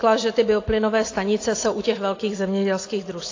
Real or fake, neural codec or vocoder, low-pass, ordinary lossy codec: real; none; 7.2 kHz; AAC, 32 kbps